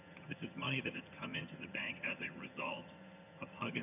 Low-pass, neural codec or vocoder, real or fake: 3.6 kHz; vocoder, 22.05 kHz, 80 mel bands, HiFi-GAN; fake